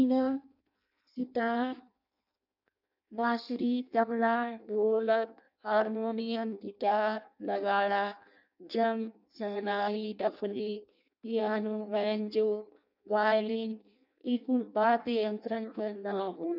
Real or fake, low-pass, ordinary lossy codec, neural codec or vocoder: fake; 5.4 kHz; none; codec, 16 kHz in and 24 kHz out, 0.6 kbps, FireRedTTS-2 codec